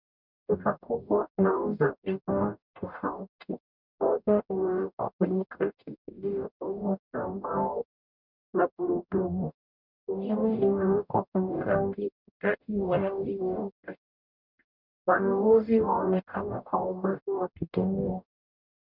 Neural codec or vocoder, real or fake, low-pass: codec, 44.1 kHz, 0.9 kbps, DAC; fake; 5.4 kHz